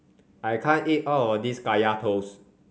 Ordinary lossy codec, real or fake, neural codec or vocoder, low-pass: none; real; none; none